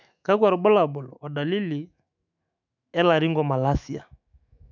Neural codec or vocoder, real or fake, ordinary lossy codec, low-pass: autoencoder, 48 kHz, 128 numbers a frame, DAC-VAE, trained on Japanese speech; fake; none; 7.2 kHz